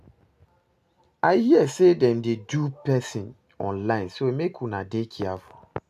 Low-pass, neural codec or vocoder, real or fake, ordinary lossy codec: 14.4 kHz; none; real; AAC, 96 kbps